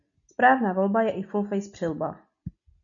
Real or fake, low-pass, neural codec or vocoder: real; 7.2 kHz; none